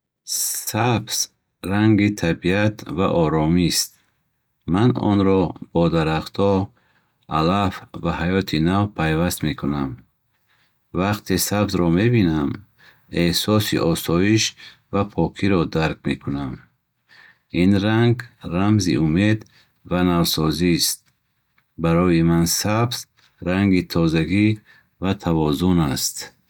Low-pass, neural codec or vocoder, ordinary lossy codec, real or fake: none; none; none; real